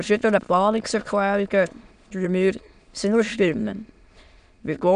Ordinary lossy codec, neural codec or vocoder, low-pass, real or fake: none; autoencoder, 22.05 kHz, a latent of 192 numbers a frame, VITS, trained on many speakers; 9.9 kHz; fake